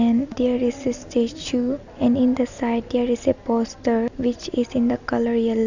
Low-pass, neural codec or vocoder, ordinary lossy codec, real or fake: 7.2 kHz; none; none; real